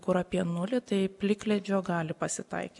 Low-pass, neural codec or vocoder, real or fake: 10.8 kHz; none; real